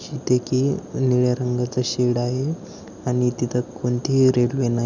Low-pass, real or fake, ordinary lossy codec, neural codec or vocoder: 7.2 kHz; real; none; none